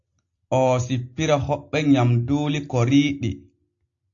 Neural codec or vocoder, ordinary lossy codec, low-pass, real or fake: none; AAC, 48 kbps; 7.2 kHz; real